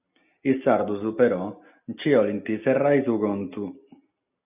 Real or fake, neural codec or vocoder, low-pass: real; none; 3.6 kHz